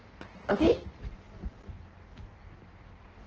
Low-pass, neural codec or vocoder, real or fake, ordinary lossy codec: 7.2 kHz; codec, 16 kHz in and 24 kHz out, 1.1 kbps, FireRedTTS-2 codec; fake; Opus, 16 kbps